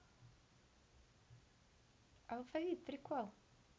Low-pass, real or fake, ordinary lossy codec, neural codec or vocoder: none; real; none; none